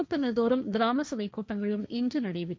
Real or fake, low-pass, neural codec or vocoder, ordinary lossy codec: fake; none; codec, 16 kHz, 1.1 kbps, Voila-Tokenizer; none